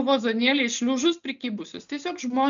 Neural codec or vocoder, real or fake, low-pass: none; real; 7.2 kHz